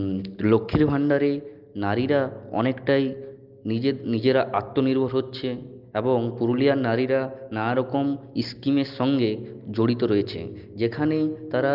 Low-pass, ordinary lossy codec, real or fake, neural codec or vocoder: 5.4 kHz; Opus, 32 kbps; real; none